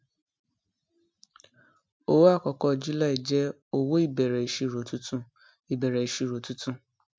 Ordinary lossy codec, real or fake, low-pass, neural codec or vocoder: none; real; none; none